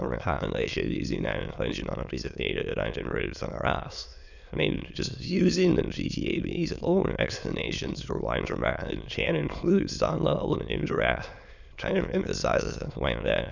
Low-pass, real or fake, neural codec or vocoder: 7.2 kHz; fake; autoencoder, 22.05 kHz, a latent of 192 numbers a frame, VITS, trained on many speakers